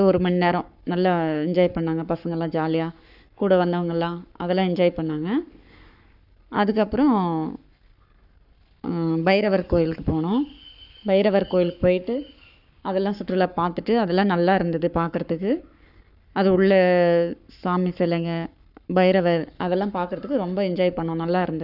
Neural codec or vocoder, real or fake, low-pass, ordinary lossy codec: codec, 44.1 kHz, 7.8 kbps, Pupu-Codec; fake; 5.4 kHz; none